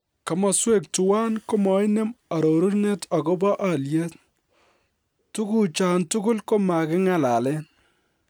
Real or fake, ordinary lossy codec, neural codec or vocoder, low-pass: real; none; none; none